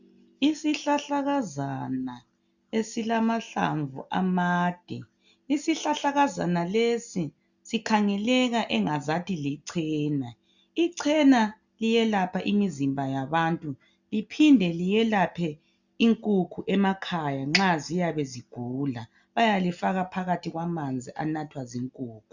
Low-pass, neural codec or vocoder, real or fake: 7.2 kHz; none; real